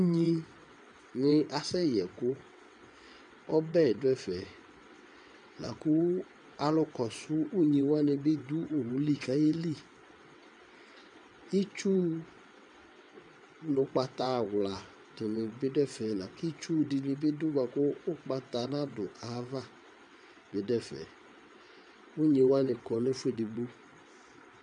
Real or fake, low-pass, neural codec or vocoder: fake; 9.9 kHz; vocoder, 22.05 kHz, 80 mel bands, WaveNeXt